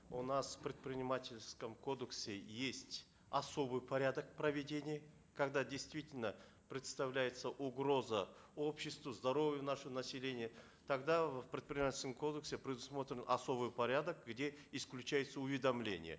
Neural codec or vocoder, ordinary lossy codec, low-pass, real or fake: none; none; none; real